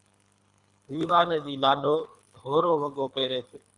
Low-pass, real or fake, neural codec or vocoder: 10.8 kHz; fake; codec, 24 kHz, 3 kbps, HILCodec